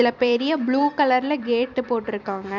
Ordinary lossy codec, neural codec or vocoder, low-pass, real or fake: none; vocoder, 44.1 kHz, 128 mel bands every 256 samples, BigVGAN v2; 7.2 kHz; fake